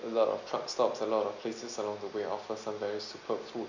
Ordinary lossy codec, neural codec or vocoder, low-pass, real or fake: none; none; 7.2 kHz; real